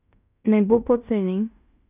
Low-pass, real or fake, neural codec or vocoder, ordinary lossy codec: 3.6 kHz; fake; codec, 16 kHz in and 24 kHz out, 0.9 kbps, LongCat-Audio-Codec, fine tuned four codebook decoder; none